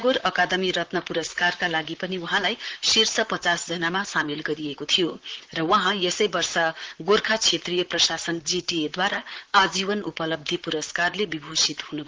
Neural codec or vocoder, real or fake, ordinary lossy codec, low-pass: vocoder, 44.1 kHz, 128 mel bands, Pupu-Vocoder; fake; Opus, 16 kbps; 7.2 kHz